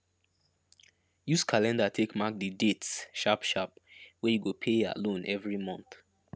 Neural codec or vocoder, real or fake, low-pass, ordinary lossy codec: none; real; none; none